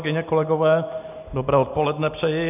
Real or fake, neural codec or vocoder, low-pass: real; none; 3.6 kHz